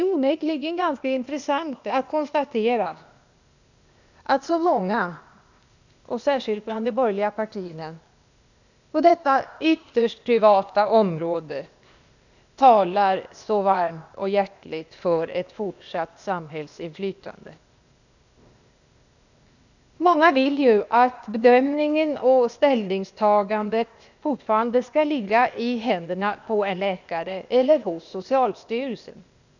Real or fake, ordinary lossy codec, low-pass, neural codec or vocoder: fake; none; 7.2 kHz; codec, 16 kHz, 0.8 kbps, ZipCodec